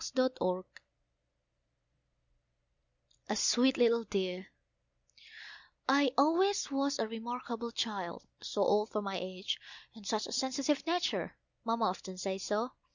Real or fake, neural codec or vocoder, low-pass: real; none; 7.2 kHz